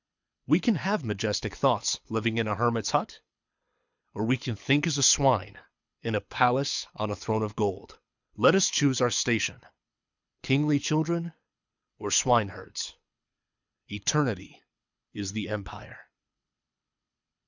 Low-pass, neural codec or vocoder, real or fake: 7.2 kHz; codec, 24 kHz, 6 kbps, HILCodec; fake